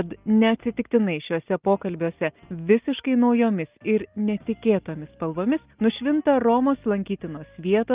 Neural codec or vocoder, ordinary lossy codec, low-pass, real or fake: none; Opus, 24 kbps; 3.6 kHz; real